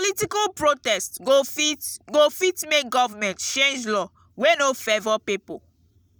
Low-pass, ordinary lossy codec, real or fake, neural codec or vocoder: none; none; real; none